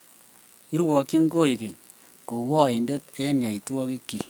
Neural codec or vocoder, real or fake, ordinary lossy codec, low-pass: codec, 44.1 kHz, 2.6 kbps, SNAC; fake; none; none